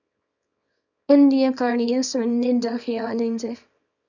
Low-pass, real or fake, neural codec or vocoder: 7.2 kHz; fake; codec, 24 kHz, 0.9 kbps, WavTokenizer, small release